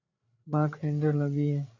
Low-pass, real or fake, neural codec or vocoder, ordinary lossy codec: 7.2 kHz; fake; codec, 16 kHz, 8 kbps, FreqCodec, larger model; AAC, 32 kbps